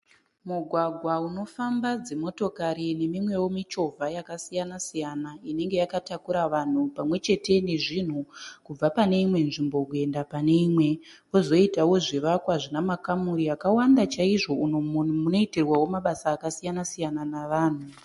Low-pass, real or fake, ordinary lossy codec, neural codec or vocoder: 14.4 kHz; real; MP3, 48 kbps; none